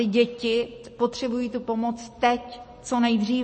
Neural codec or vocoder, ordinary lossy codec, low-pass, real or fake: none; MP3, 32 kbps; 10.8 kHz; real